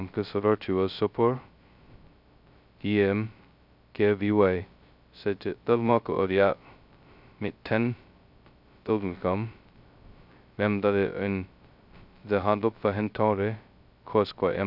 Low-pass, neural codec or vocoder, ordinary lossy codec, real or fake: 5.4 kHz; codec, 16 kHz, 0.2 kbps, FocalCodec; none; fake